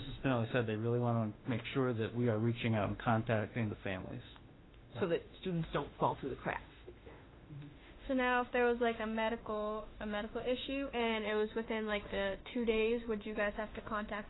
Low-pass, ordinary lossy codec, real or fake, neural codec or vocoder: 7.2 kHz; AAC, 16 kbps; fake; autoencoder, 48 kHz, 32 numbers a frame, DAC-VAE, trained on Japanese speech